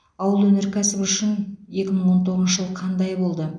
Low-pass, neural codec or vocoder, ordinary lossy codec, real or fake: 9.9 kHz; none; none; real